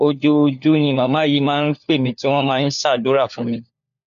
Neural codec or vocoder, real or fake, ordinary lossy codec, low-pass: codec, 16 kHz, 4 kbps, FunCodec, trained on LibriTTS, 50 frames a second; fake; none; 7.2 kHz